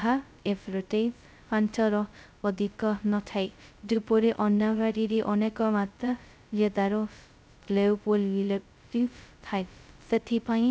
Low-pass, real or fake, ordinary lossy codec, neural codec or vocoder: none; fake; none; codec, 16 kHz, 0.2 kbps, FocalCodec